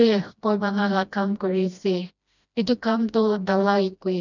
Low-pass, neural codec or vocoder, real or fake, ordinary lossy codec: 7.2 kHz; codec, 16 kHz, 1 kbps, FreqCodec, smaller model; fake; none